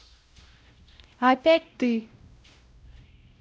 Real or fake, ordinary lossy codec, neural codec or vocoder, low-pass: fake; none; codec, 16 kHz, 0.5 kbps, X-Codec, WavLM features, trained on Multilingual LibriSpeech; none